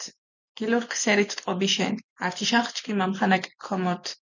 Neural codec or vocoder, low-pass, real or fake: none; 7.2 kHz; real